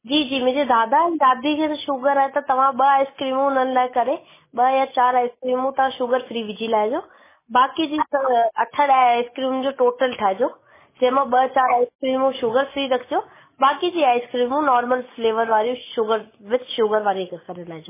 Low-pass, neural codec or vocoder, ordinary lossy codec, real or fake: 3.6 kHz; none; MP3, 16 kbps; real